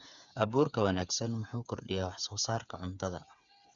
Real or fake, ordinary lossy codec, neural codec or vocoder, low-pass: fake; none; codec, 16 kHz, 8 kbps, FreqCodec, smaller model; 7.2 kHz